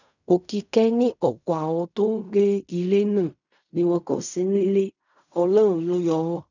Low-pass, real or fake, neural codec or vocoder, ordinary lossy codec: 7.2 kHz; fake; codec, 16 kHz in and 24 kHz out, 0.4 kbps, LongCat-Audio-Codec, fine tuned four codebook decoder; none